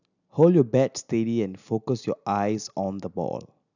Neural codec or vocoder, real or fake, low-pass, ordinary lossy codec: none; real; 7.2 kHz; none